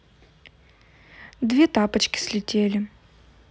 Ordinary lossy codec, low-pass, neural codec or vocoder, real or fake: none; none; none; real